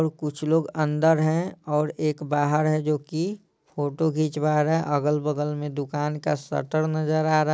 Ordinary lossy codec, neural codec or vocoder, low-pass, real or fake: none; none; none; real